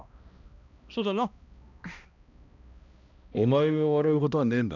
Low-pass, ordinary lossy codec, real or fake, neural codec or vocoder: 7.2 kHz; none; fake; codec, 16 kHz, 1 kbps, X-Codec, HuBERT features, trained on balanced general audio